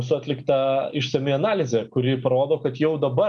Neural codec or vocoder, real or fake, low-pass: none; real; 10.8 kHz